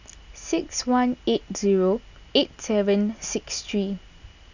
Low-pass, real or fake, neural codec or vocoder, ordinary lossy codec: 7.2 kHz; real; none; none